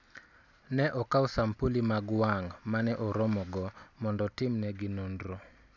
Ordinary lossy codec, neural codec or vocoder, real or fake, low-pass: none; none; real; 7.2 kHz